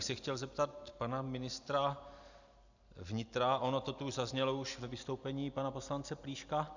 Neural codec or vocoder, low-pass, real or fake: none; 7.2 kHz; real